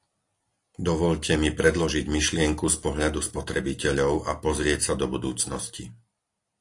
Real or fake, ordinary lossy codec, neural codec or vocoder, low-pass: real; AAC, 48 kbps; none; 10.8 kHz